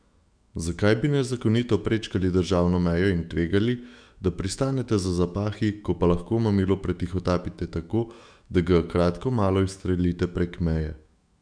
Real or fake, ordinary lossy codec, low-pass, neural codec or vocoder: fake; none; 9.9 kHz; autoencoder, 48 kHz, 128 numbers a frame, DAC-VAE, trained on Japanese speech